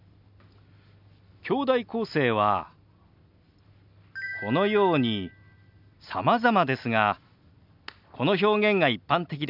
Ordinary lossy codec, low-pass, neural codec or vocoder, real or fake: none; 5.4 kHz; none; real